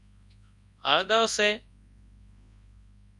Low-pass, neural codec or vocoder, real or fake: 10.8 kHz; codec, 24 kHz, 0.9 kbps, WavTokenizer, large speech release; fake